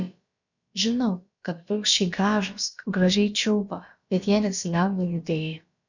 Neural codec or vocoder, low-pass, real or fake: codec, 16 kHz, about 1 kbps, DyCAST, with the encoder's durations; 7.2 kHz; fake